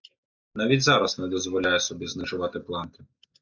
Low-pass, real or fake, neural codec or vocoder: 7.2 kHz; real; none